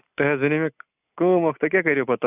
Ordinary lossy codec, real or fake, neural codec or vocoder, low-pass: none; real; none; 3.6 kHz